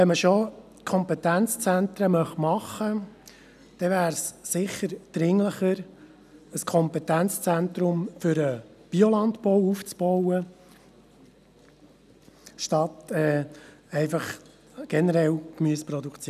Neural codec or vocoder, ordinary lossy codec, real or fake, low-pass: none; none; real; 14.4 kHz